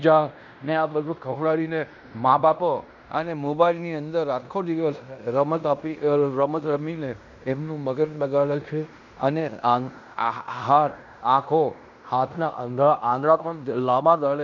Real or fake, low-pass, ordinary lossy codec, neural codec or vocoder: fake; 7.2 kHz; none; codec, 16 kHz in and 24 kHz out, 0.9 kbps, LongCat-Audio-Codec, fine tuned four codebook decoder